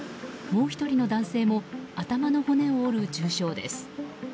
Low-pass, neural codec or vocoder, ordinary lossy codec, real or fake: none; none; none; real